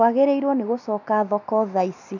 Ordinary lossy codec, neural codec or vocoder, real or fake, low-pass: none; none; real; 7.2 kHz